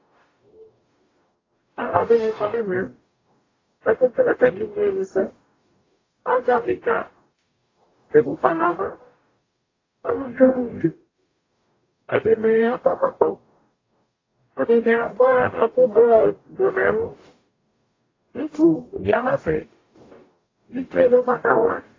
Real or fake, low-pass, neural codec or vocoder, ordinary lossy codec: fake; 7.2 kHz; codec, 44.1 kHz, 0.9 kbps, DAC; AAC, 32 kbps